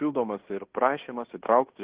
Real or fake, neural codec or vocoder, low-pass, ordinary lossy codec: fake; codec, 16 kHz in and 24 kHz out, 0.9 kbps, LongCat-Audio-Codec, fine tuned four codebook decoder; 3.6 kHz; Opus, 16 kbps